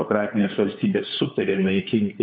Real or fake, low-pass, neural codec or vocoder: fake; 7.2 kHz; codec, 16 kHz, 4 kbps, FunCodec, trained on LibriTTS, 50 frames a second